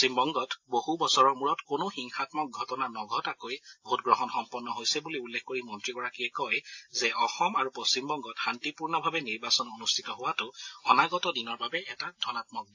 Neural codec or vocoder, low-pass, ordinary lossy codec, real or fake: none; 7.2 kHz; AAC, 48 kbps; real